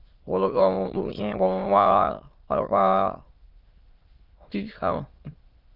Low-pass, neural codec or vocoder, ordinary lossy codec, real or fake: 5.4 kHz; autoencoder, 22.05 kHz, a latent of 192 numbers a frame, VITS, trained on many speakers; Opus, 32 kbps; fake